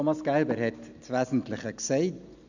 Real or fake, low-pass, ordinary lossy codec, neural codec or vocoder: real; 7.2 kHz; none; none